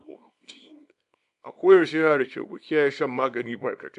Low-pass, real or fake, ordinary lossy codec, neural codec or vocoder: 10.8 kHz; fake; AAC, 96 kbps; codec, 24 kHz, 0.9 kbps, WavTokenizer, small release